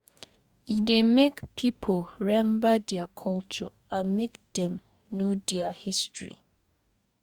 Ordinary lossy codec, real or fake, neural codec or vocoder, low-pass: Opus, 64 kbps; fake; codec, 44.1 kHz, 2.6 kbps, DAC; 19.8 kHz